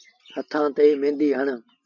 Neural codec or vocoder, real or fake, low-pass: none; real; 7.2 kHz